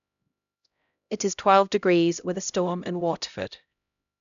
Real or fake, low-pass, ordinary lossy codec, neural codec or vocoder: fake; 7.2 kHz; none; codec, 16 kHz, 0.5 kbps, X-Codec, HuBERT features, trained on LibriSpeech